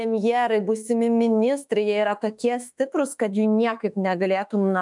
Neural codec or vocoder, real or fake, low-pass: autoencoder, 48 kHz, 32 numbers a frame, DAC-VAE, trained on Japanese speech; fake; 10.8 kHz